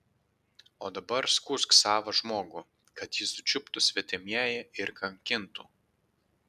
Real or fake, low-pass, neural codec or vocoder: real; 14.4 kHz; none